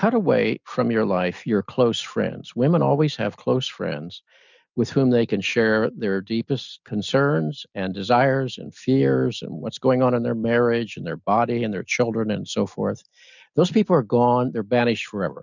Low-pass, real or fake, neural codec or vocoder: 7.2 kHz; real; none